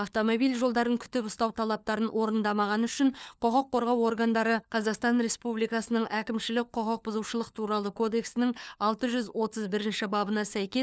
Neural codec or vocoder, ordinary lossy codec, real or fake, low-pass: codec, 16 kHz, 4 kbps, FunCodec, trained on LibriTTS, 50 frames a second; none; fake; none